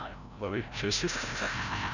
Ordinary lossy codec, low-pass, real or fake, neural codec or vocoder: none; 7.2 kHz; fake; codec, 16 kHz, 0.5 kbps, FreqCodec, larger model